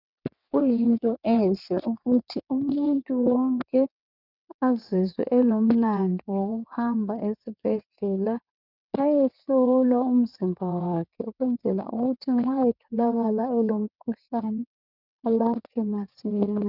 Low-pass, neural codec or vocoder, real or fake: 5.4 kHz; vocoder, 22.05 kHz, 80 mel bands, WaveNeXt; fake